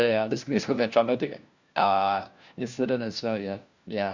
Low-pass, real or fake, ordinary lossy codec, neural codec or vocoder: 7.2 kHz; fake; Opus, 64 kbps; codec, 16 kHz, 1 kbps, FunCodec, trained on LibriTTS, 50 frames a second